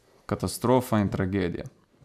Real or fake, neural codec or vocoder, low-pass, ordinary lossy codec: real; none; 14.4 kHz; none